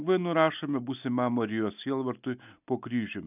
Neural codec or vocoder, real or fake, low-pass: none; real; 3.6 kHz